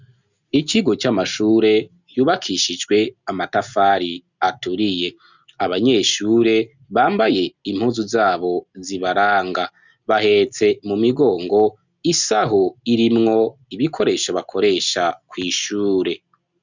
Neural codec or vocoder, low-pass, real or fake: none; 7.2 kHz; real